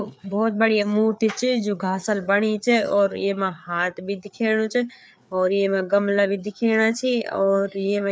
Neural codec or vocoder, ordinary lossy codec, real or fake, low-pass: codec, 16 kHz, 4 kbps, FreqCodec, larger model; none; fake; none